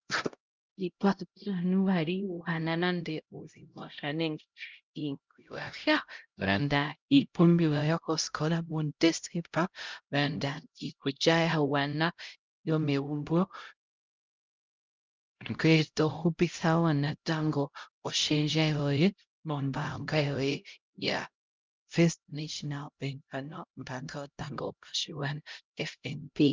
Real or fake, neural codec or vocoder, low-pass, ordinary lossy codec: fake; codec, 16 kHz, 0.5 kbps, X-Codec, HuBERT features, trained on LibriSpeech; 7.2 kHz; Opus, 24 kbps